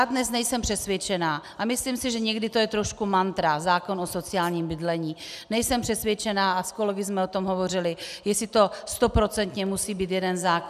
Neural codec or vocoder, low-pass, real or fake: none; 14.4 kHz; real